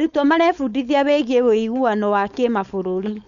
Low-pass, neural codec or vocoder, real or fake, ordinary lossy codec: 7.2 kHz; codec, 16 kHz, 4.8 kbps, FACodec; fake; none